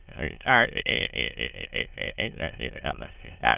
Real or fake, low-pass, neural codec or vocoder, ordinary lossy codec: fake; 3.6 kHz; autoencoder, 22.05 kHz, a latent of 192 numbers a frame, VITS, trained on many speakers; Opus, 24 kbps